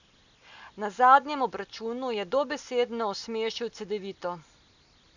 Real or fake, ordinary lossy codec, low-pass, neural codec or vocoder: real; none; 7.2 kHz; none